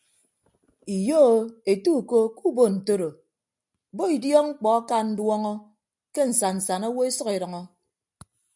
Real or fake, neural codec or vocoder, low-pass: real; none; 10.8 kHz